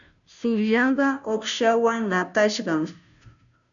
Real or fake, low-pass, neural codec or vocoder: fake; 7.2 kHz; codec, 16 kHz, 0.5 kbps, FunCodec, trained on Chinese and English, 25 frames a second